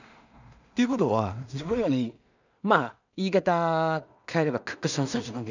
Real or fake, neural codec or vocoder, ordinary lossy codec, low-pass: fake; codec, 16 kHz in and 24 kHz out, 0.4 kbps, LongCat-Audio-Codec, two codebook decoder; none; 7.2 kHz